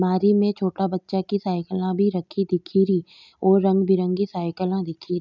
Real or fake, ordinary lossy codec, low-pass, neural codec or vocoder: real; none; 7.2 kHz; none